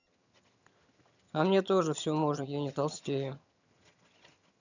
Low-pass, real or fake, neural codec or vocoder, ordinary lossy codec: 7.2 kHz; fake; vocoder, 22.05 kHz, 80 mel bands, HiFi-GAN; none